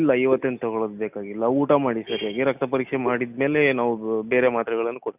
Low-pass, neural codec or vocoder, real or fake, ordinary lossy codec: 3.6 kHz; none; real; none